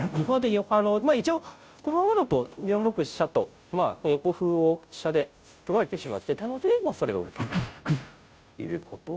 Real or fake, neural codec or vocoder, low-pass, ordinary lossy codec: fake; codec, 16 kHz, 0.5 kbps, FunCodec, trained on Chinese and English, 25 frames a second; none; none